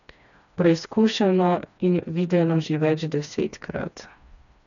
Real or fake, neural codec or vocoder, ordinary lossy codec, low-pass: fake; codec, 16 kHz, 2 kbps, FreqCodec, smaller model; none; 7.2 kHz